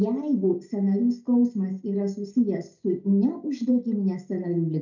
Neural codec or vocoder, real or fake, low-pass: none; real; 7.2 kHz